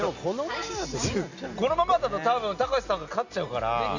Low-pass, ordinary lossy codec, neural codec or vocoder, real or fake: 7.2 kHz; none; none; real